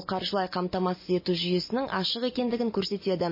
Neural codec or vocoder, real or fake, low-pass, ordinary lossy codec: none; real; 5.4 kHz; MP3, 24 kbps